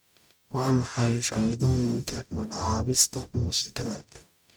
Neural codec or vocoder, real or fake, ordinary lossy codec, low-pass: codec, 44.1 kHz, 0.9 kbps, DAC; fake; none; none